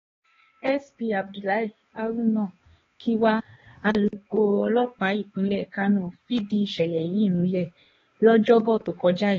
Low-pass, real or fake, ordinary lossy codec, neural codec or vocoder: 7.2 kHz; fake; AAC, 24 kbps; codec, 16 kHz, 2 kbps, X-Codec, HuBERT features, trained on balanced general audio